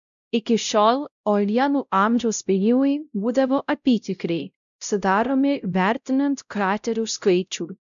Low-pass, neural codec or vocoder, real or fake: 7.2 kHz; codec, 16 kHz, 0.5 kbps, X-Codec, WavLM features, trained on Multilingual LibriSpeech; fake